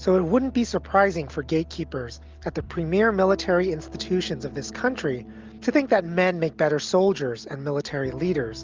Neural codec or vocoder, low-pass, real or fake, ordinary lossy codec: none; 7.2 kHz; real; Opus, 32 kbps